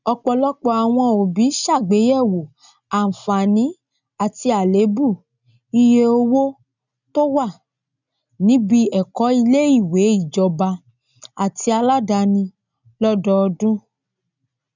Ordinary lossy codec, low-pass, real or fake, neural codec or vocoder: none; 7.2 kHz; real; none